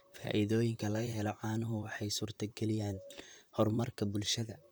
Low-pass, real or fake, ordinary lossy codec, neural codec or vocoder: none; fake; none; vocoder, 44.1 kHz, 128 mel bands, Pupu-Vocoder